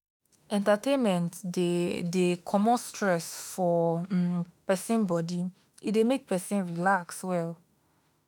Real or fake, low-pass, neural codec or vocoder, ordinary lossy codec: fake; none; autoencoder, 48 kHz, 32 numbers a frame, DAC-VAE, trained on Japanese speech; none